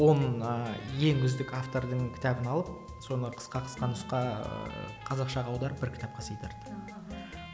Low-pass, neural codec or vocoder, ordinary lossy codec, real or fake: none; none; none; real